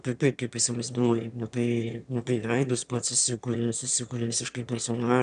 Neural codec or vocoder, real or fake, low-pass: autoencoder, 22.05 kHz, a latent of 192 numbers a frame, VITS, trained on one speaker; fake; 9.9 kHz